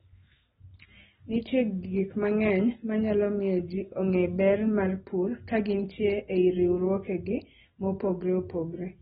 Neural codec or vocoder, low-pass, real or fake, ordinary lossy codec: none; 19.8 kHz; real; AAC, 16 kbps